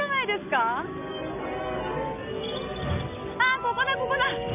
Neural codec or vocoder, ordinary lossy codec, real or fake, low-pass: none; none; real; 3.6 kHz